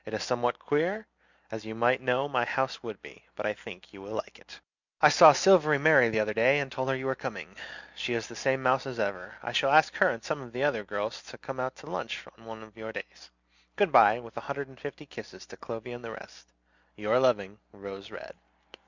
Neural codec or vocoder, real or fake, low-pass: none; real; 7.2 kHz